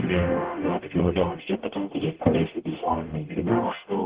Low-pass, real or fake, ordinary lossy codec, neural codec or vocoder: 3.6 kHz; fake; Opus, 16 kbps; codec, 44.1 kHz, 0.9 kbps, DAC